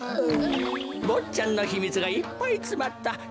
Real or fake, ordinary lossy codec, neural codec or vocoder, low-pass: real; none; none; none